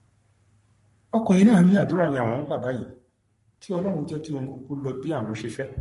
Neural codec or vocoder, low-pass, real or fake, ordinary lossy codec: codec, 44.1 kHz, 3.4 kbps, Pupu-Codec; 14.4 kHz; fake; MP3, 48 kbps